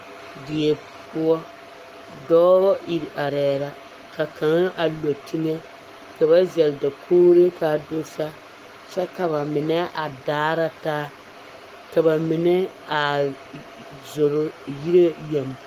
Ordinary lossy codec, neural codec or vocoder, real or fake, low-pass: Opus, 24 kbps; codec, 44.1 kHz, 7.8 kbps, Pupu-Codec; fake; 14.4 kHz